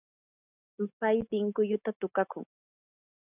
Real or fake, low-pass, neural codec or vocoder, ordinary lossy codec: real; 3.6 kHz; none; AAC, 24 kbps